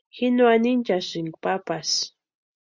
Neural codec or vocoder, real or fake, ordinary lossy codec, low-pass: none; real; Opus, 64 kbps; 7.2 kHz